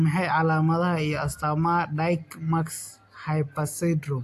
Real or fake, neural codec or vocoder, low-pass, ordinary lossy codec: real; none; 14.4 kHz; AAC, 64 kbps